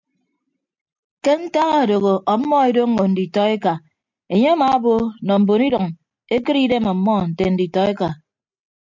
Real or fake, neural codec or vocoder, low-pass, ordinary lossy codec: real; none; 7.2 kHz; MP3, 64 kbps